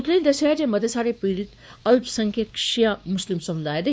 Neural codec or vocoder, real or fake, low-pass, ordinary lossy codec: codec, 16 kHz, 2 kbps, X-Codec, WavLM features, trained on Multilingual LibriSpeech; fake; none; none